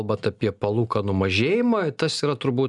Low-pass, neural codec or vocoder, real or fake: 10.8 kHz; none; real